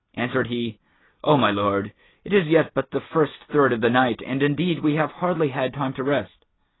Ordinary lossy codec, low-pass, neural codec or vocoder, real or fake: AAC, 16 kbps; 7.2 kHz; none; real